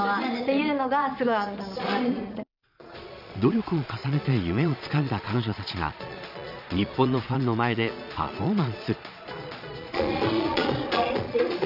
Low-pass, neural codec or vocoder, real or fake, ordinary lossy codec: 5.4 kHz; vocoder, 22.05 kHz, 80 mel bands, Vocos; fake; none